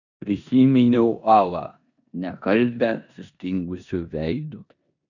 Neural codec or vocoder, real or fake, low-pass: codec, 16 kHz in and 24 kHz out, 0.9 kbps, LongCat-Audio-Codec, four codebook decoder; fake; 7.2 kHz